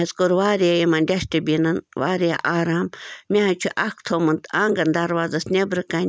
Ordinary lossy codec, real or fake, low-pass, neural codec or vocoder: none; real; none; none